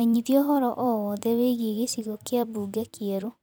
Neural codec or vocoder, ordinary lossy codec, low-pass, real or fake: vocoder, 44.1 kHz, 128 mel bands every 256 samples, BigVGAN v2; none; none; fake